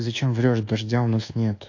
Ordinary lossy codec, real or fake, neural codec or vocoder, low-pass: AAC, 48 kbps; fake; codec, 24 kHz, 1.2 kbps, DualCodec; 7.2 kHz